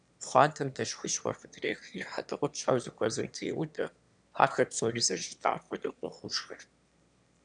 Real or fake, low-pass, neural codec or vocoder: fake; 9.9 kHz; autoencoder, 22.05 kHz, a latent of 192 numbers a frame, VITS, trained on one speaker